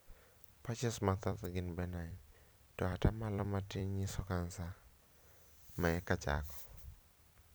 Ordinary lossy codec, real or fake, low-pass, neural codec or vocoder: none; fake; none; vocoder, 44.1 kHz, 128 mel bands every 256 samples, BigVGAN v2